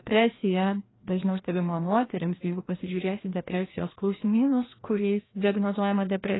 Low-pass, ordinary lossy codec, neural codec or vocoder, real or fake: 7.2 kHz; AAC, 16 kbps; codec, 16 kHz, 1 kbps, FreqCodec, larger model; fake